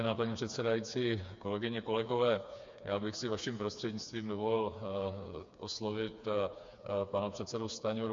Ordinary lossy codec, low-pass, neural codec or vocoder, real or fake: MP3, 48 kbps; 7.2 kHz; codec, 16 kHz, 4 kbps, FreqCodec, smaller model; fake